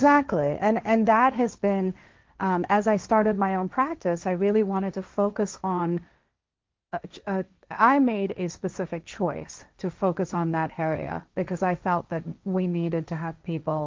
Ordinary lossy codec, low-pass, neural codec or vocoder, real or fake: Opus, 32 kbps; 7.2 kHz; codec, 16 kHz, 1.1 kbps, Voila-Tokenizer; fake